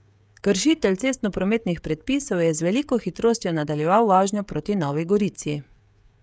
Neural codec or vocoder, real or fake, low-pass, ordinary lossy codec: codec, 16 kHz, 16 kbps, FreqCodec, smaller model; fake; none; none